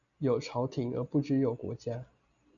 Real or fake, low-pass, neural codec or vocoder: real; 7.2 kHz; none